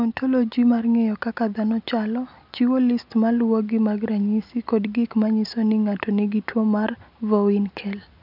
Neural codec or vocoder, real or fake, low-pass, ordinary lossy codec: none; real; 5.4 kHz; none